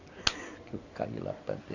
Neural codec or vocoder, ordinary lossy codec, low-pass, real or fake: none; none; 7.2 kHz; real